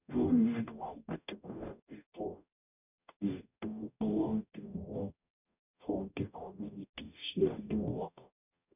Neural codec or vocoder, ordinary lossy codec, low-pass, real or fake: codec, 44.1 kHz, 0.9 kbps, DAC; none; 3.6 kHz; fake